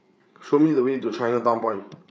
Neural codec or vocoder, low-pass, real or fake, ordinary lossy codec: codec, 16 kHz, 8 kbps, FreqCodec, larger model; none; fake; none